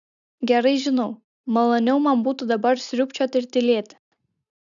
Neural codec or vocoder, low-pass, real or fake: none; 7.2 kHz; real